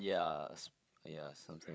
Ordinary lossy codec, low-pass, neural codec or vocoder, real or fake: none; none; codec, 16 kHz, 8 kbps, FreqCodec, larger model; fake